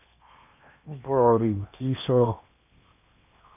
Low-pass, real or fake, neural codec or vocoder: 3.6 kHz; fake; codec, 16 kHz in and 24 kHz out, 0.8 kbps, FocalCodec, streaming, 65536 codes